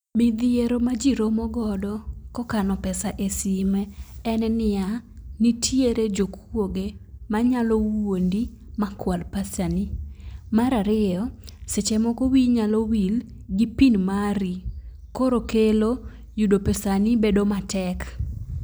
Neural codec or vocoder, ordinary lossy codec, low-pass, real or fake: none; none; none; real